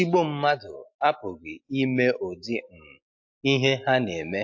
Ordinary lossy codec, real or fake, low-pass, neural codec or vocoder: AAC, 48 kbps; real; 7.2 kHz; none